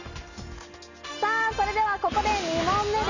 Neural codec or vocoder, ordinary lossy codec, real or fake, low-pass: none; none; real; 7.2 kHz